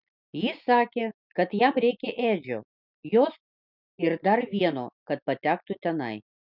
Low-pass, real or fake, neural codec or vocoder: 5.4 kHz; real; none